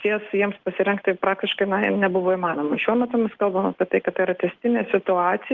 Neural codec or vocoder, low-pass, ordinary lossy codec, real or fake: none; 7.2 kHz; Opus, 16 kbps; real